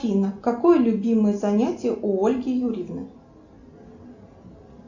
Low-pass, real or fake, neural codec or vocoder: 7.2 kHz; real; none